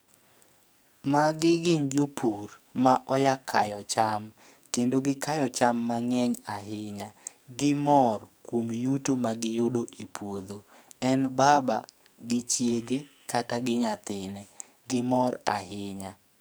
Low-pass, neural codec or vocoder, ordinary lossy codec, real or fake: none; codec, 44.1 kHz, 2.6 kbps, SNAC; none; fake